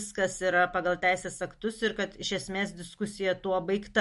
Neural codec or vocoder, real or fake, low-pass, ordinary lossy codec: none; real; 14.4 kHz; MP3, 48 kbps